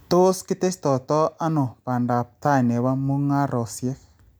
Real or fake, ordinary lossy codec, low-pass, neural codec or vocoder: real; none; none; none